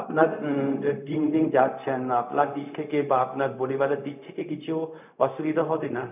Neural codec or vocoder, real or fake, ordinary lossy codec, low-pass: codec, 16 kHz, 0.4 kbps, LongCat-Audio-Codec; fake; none; 3.6 kHz